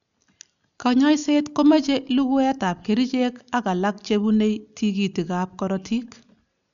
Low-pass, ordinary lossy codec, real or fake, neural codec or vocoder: 7.2 kHz; none; real; none